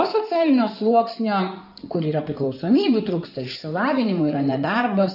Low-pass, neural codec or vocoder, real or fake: 5.4 kHz; codec, 16 kHz in and 24 kHz out, 2.2 kbps, FireRedTTS-2 codec; fake